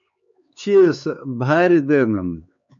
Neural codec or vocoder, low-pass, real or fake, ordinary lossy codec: codec, 16 kHz, 4 kbps, X-Codec, HuBERT features, trained on LibriSpeech; 7.2 kHz; fake; MP3, 48 kbps